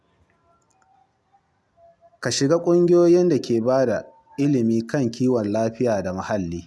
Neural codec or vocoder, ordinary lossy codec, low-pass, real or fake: none; none; none; real